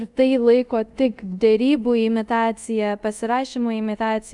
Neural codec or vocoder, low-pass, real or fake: codec, 24 kHz, 0.5 kbps, DualCodec; 10.8 kHz; fake